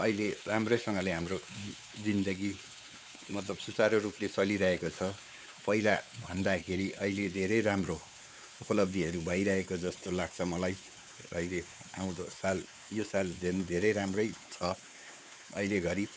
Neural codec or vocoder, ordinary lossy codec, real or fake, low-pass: codec, 16 kHz, 4 kbps, X-Codec, WavLM features, trained on Multilingual LibriSpeech; none; fake; none